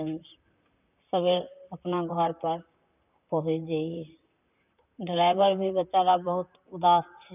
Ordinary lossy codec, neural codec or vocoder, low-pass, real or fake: none; vocoder, 44.1 kHz, 128 mel bands, Pupu-Vocoder; 3.6 kHz; fake